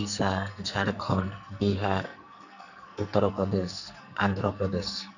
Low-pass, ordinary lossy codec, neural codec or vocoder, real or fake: 7.2 kHz; none; codec, 44.1 kHz, 2.6 kbps, SNAC; fake